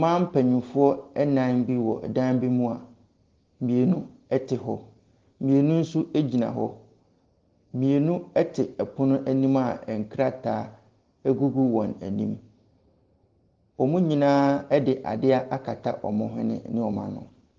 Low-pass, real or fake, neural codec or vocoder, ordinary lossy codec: 7.2 kHz; real; none; Opus, 16 kbps